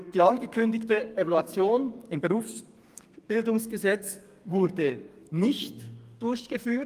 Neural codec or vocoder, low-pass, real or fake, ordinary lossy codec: codec, 32 kHz, 1.9 kbps, SNAC; 14.4 kHz; fake; Opus, 32 kbps